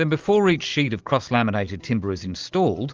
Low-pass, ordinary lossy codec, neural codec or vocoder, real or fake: 7.2 kHz; Opus, 24 kbps; none; real